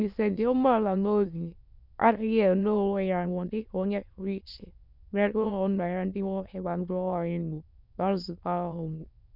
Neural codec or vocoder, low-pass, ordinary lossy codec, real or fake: autoencoder, 22.05 kHz, a latent of 192 numbers a frame, VITS, trained on many speakers; 5.4 kHz; none; fake